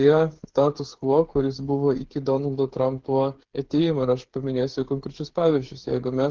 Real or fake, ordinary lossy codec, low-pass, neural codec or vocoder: fake; Opus, 16 kbps; 7.2 kHz; codec, 16 kHz, 8 kbps, FreqCodec, smaller model